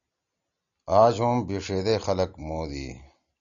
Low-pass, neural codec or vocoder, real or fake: 7.2 kHz; none; real